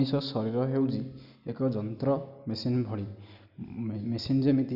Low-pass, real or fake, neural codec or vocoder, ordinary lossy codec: 5.4 kHz; real; none; none